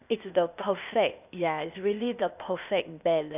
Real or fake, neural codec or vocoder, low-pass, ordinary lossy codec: fake; codec, 16 kHz, 0.8 kbps, ZipCodec; 3.6 kHz; none